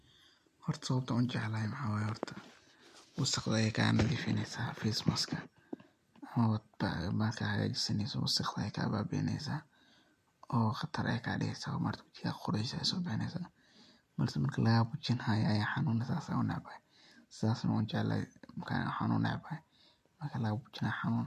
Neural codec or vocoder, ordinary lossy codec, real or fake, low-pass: none; MP3, 64 kbps; real; 14.4 kHz